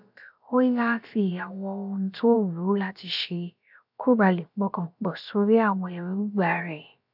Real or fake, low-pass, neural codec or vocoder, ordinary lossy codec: fake; 5.4 kHz; codec, 16 kHz, about 1 kbps, DyCAST, with the encoder's durations; none